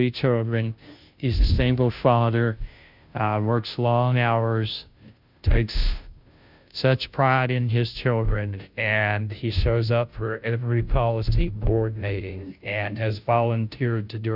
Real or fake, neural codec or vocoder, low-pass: fake; codec, 16 kHz, 0.5 kbps, FunCodec, trained on Chinese and English, 25 frames a second; 5.4 kHz